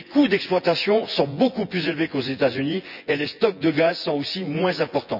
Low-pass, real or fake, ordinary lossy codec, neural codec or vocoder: 5.4 kHz; fake; none; vocoder, 24 kHz, 100 mel bands, Vocos